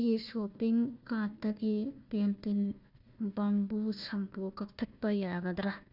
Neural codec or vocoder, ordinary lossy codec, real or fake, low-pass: codec, 16 kHz, 1 kbps, FunCodec, trained on Chinese and English, 50 frames a second; Opus, 64 kbps; fake; 5.4 kHz